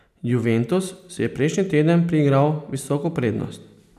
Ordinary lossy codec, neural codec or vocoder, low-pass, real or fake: none; none; 14.4 kHz; real